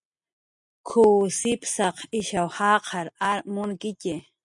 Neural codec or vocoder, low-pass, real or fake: none; 10.8 kHz; real